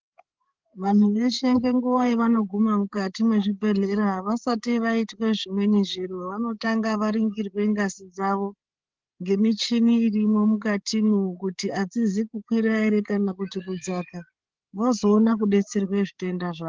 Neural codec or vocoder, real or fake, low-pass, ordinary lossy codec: codec, 16 kHz, 8 kbps, FreqCodec, larger model; fake; 7.2 kHz; Opus, 16 kbps